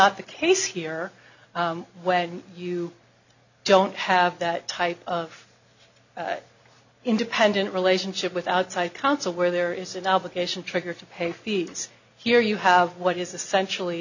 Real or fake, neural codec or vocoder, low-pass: real; none; 7.2 kHz